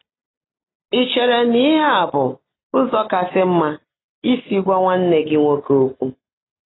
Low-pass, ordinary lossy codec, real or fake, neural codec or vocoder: 7.2 kHz; AAC, 16 kbps; real; none